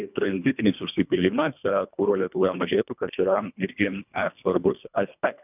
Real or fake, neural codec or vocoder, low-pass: fake; codec, 24 kHz, 1.5 kbps, HILCodec; 3.6 kHz